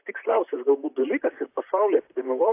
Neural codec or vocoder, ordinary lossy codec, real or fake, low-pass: vocoder, 44.1 kHz, 128 mel bands, Pupu-Vocoder; AAC, 24 kbps; fake; 3.6 kHz